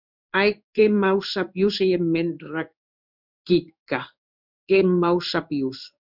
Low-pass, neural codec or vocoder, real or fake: 5.4 kHz; codec, 16 kHz in and 24 kHz out, 1 kbps, XY-Tokenizer; fake